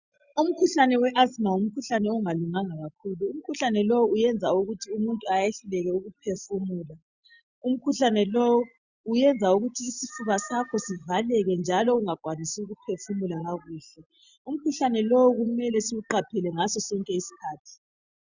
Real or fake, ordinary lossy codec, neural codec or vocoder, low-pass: real; Opus, 64 kbps; none; 7.2 kHz